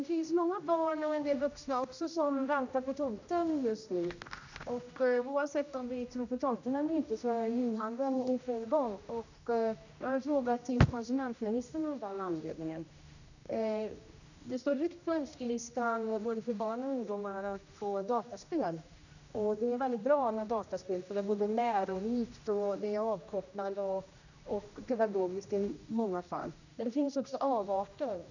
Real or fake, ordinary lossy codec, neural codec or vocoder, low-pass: fake; none; codec, 16 kHz, 1 kbps, X-Codec, HuBERT features, trained on general audio; 7.2 kHz